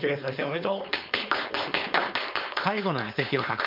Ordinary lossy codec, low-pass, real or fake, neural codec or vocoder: MP3, 48 kbps; 5.4 kHz; fake; codec, 16 kHz, 4.8 kbps, FACodec